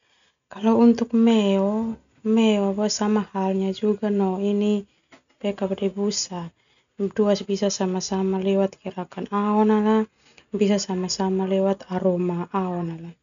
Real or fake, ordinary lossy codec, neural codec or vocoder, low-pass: real; MP3, 96 kbps; none; 7.2 kHz